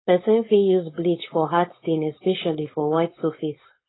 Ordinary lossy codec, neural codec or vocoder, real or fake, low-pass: AAC, 16 kbps; codec, 16 kHz, 4.8 kbps, FACodec; fake; 7.2 kHz